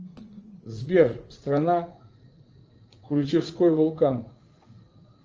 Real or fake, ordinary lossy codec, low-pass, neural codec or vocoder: fake; Opus, 24 kbps; 7.2 kHz; vocoder, 44.1 kHz, 80 mel bands, Vocos